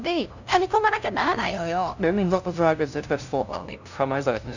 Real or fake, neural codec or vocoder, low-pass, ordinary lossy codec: fake; codec, 16 kHz, 0.5 kbps, FunCodec, trained on LibriTTS, 25 frames a second; 7.2 kHz; none